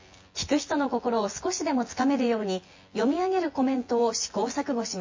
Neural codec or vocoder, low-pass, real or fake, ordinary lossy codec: vocoder, 24 kHz, 100 mel bands, Vocos; 7.2 kHz; fake; MP3, 32 kbps